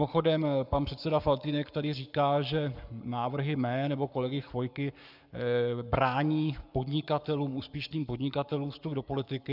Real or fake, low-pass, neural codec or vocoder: fake; 5.4 kHz; codec, 44.1 kHz, 7.8 kbps, Pupu-Codec